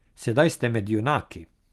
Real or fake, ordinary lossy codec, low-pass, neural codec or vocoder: real; Opus, 24 kbps; 14.4 kHz; none